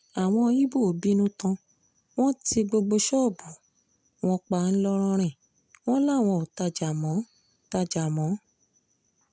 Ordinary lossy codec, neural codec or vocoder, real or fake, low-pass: none; none; real; none